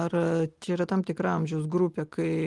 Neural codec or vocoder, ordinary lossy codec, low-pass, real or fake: none; Opus, 24 kbps; 10.8 kHz; real